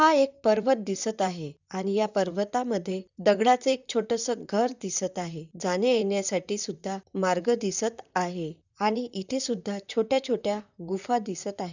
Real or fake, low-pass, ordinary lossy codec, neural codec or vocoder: fake; 7.2 kHz; none; vocoder, 44.1 kHz, 128 mel bands, Pupu-Vocoder